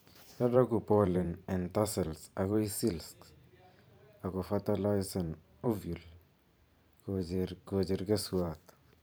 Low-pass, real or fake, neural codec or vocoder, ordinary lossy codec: none; fake; vocoder, 44.1 kHz, 128 mel bands every 512 samples, BigVGAN v2; none